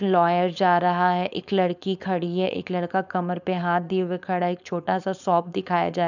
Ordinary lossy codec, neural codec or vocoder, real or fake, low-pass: none; codec, 16 kHz, 4.8 kbps, FACodec; fake; 7.2 kHz